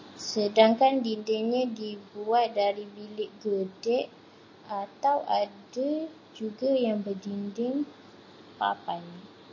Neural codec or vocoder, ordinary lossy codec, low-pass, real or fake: none; MP3, 32 kbps; 7.2 kHz; real